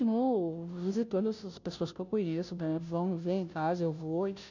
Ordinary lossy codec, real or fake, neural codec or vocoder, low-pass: none; fake; codec, 16 kHz, 0.5 kbps, FunCodec, trained on Chinese and English, 25 frames a second; 7.2 kHz